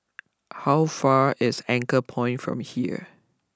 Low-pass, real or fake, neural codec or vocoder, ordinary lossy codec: none; real; none; none